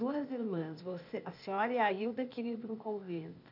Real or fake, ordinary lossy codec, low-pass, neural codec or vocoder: fake; none; 5.4 kHz; codec, 16 kHz, 0.8 kbps, ZipCodec